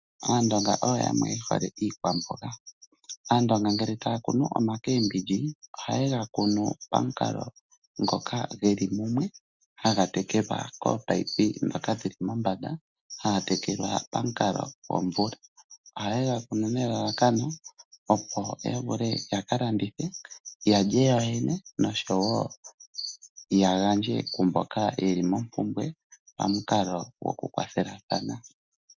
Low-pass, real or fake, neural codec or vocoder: 7.2 kHz; real; none